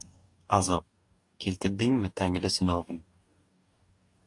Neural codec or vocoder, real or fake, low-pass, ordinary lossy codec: codec, 44.1 kHz, 2.6 kbps, DAC; fake; 10.8 kHz; AAC, 48 kbps